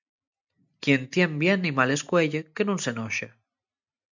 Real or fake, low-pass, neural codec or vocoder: real; 7.2 kHz; none